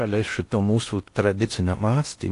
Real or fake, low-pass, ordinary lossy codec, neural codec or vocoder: fake; 10.8 kHz; AAC, 48 kbps; codec, 16 kHz in and 24 kHz out, 0.6 kbps, FocalCodec, streaming, 2048 codes